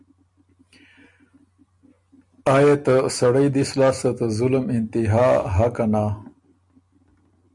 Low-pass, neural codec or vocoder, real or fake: 10.8 kHz; none; real